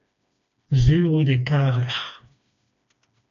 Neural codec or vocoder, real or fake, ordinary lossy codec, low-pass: codec, 16 kHz, 2 kbps, FreqCodec, smaller model; fake; AAC, 96 kbps; 7.2 kHz